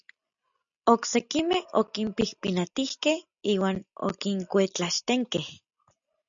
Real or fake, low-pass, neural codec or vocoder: real; 7.2 kHz; none